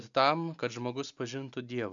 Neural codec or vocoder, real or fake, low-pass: none; real; 7.2 kHz